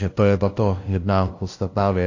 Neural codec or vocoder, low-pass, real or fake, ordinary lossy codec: codec, 16 kHz, 0.5 kbps, FunCodec, trained on LibriTTS, 25 frames a second; 7.2 kHz; fake; AAC, 48 kbps